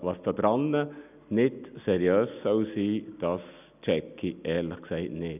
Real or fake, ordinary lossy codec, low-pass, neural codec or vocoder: real; none; 3.6 kHz; none